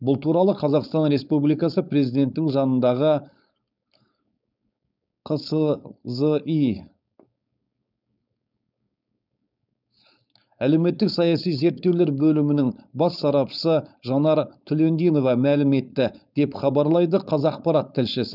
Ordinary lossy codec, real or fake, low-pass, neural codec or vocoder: none; fake; 5.4 kHz; codec, 16 kHz, 4.8 kbps, FACodec